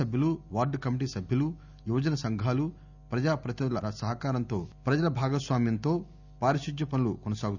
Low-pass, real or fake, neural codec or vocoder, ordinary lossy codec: 7.2 kHz; real; none; none